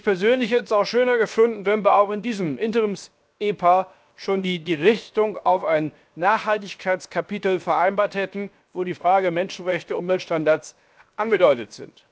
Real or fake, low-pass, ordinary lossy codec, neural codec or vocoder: fake; none; none; codec, 16 kHz, 0.7 kbps, FocalCodec